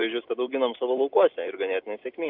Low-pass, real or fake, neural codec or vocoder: 5.4 kHz; real; none